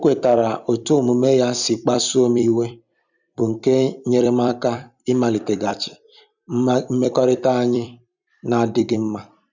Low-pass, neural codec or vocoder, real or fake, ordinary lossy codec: 7.2 kHz; vocoder, 44.1 kHz, 128 mel bands every 256 samples, BigVGAN v2; fake; none